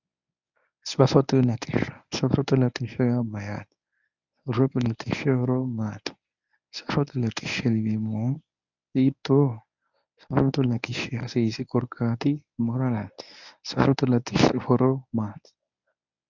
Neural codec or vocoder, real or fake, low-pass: codec, 24 kHz, 0.9 kbps, WavTokenizer, medium speech release version 1; fake; 7.2 kHz